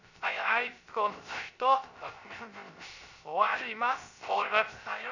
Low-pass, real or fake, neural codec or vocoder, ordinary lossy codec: 7.2 kHz; fake; codec, 16 kHz, 0.3 kbps, FocalCodec; none